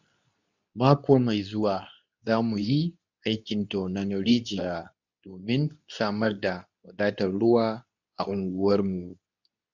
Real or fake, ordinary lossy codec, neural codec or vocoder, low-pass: fake; none; codec, 24 kHz, 0.9 kbps, WavTokenizer, medium speech release version 2; 7.2 kHz